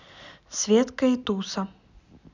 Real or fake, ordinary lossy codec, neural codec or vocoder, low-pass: real; none; none; 7.2 kHz